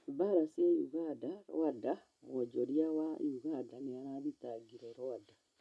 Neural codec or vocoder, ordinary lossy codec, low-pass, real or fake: none; none; none; real